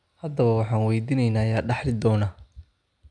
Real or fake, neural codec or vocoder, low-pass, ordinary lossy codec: real; none; 9.9 kHz; none